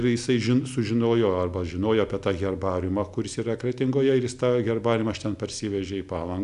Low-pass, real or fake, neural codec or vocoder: 10.8 kHz; real; none